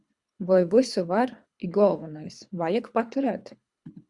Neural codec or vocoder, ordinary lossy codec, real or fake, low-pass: codec, 24 kHz, 3 kbps, HILCodec; Opus, 64 kbps; fake; 10.8 kHz